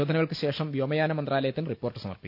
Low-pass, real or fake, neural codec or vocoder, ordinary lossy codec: 5.4 kHz; real; none; none